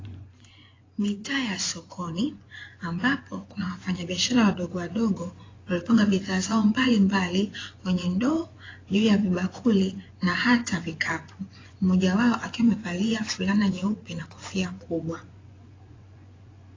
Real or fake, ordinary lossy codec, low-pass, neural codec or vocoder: fake; AAC, 32 kbps; 7.2 kHz; vocoder, 24 kHz, 100 mel bands, Vocos